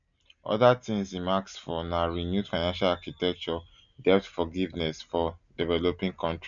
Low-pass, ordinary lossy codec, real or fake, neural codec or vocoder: 7.2 kHz; none; real; none